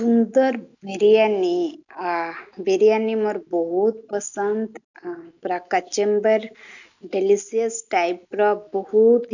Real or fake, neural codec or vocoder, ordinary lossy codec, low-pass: real; none; none; 7.2 kHz